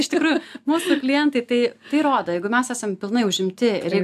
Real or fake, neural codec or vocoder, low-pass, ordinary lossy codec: real; none; 14.4 kHz; AAC, 96 kbps